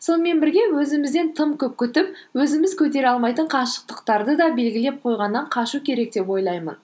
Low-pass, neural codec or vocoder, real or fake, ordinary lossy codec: none; none; real; none